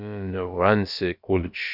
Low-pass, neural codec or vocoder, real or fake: 5.4 kHz; codec, 16 kHz, about 1 kbps, DyCAST, with the encoder's durations; fake